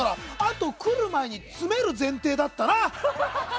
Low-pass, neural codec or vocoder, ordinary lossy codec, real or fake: none; none; none; real